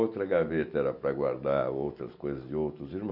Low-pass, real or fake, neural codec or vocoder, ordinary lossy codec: 5.4 kHz; real; none; none